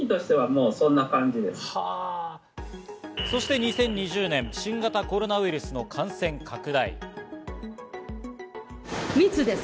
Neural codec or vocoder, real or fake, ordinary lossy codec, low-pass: none; real; none; none